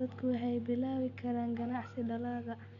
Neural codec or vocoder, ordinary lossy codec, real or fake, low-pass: none; none; real; 7.2 kHz